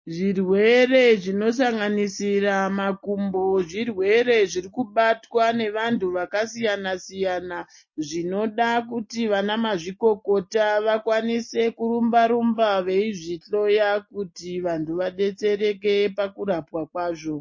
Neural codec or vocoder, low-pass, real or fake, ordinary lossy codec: none; 7.2 kHz; real; MP3, 32 kbps